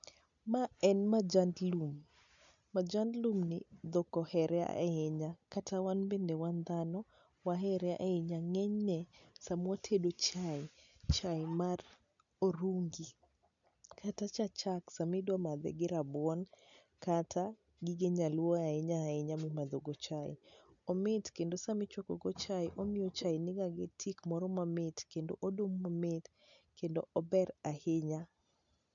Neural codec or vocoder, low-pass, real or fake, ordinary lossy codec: none; 7.2 kHz; real; none